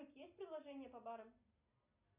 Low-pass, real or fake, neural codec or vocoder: 3.6 kHz; real; none